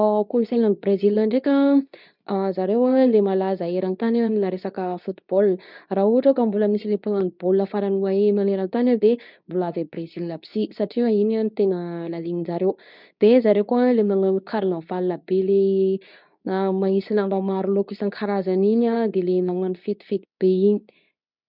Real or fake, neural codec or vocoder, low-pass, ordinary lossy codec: fake; codec, 24 kHz, 0.9 kbps, WavTokenizer, medium speech release version 2; 5.4 kHz; none